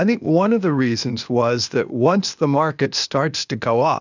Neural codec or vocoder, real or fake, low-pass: codec, 16 kHz, 0.8 kbps, ZipCodec; fake; 7.2 kHz